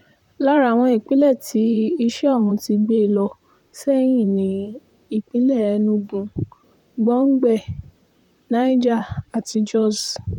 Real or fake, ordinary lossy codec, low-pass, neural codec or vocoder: fake; none; 19.8 kHz; vocoder, 44.1 kHz, 128 mel bands, Pupu-Vocoder